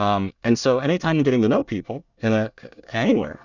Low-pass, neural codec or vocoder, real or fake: 7.2 kHz; codec, 24 kHz, 1 kbps, SNAC; fake